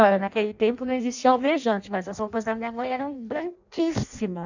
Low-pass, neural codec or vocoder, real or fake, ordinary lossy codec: 7.2 kHz; codec, 16 kHz in and 24 kHz out, 0.6 kbps, FireRedTTS-2 codec; fake; none